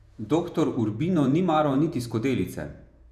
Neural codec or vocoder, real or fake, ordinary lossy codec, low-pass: vocoder, 48 kHz, 128 mel bands, Vocos; fake; none; 14.4 kHz